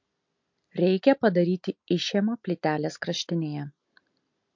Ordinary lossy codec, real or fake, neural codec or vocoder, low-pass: MP3, 48 kbps; real; none; 7.2 kHz